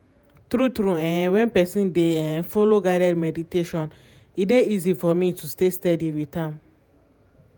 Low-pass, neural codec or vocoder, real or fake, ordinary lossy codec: none; vocoder, 48 kHz, 128 mel bands, Vocos; fake; none